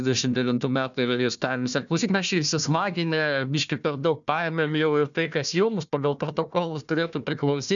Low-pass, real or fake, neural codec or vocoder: 7.2 kHz; fake; codec, 16 kHz, 1 kbps, FunCodec, trained on Chinese and English, 50 frames a second